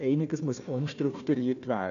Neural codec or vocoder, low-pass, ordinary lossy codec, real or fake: codec, 16 kHz, 1 kbps, FunCodec, trained on Chinese and English, 50 frames a second; 7.2 kHz; none; fake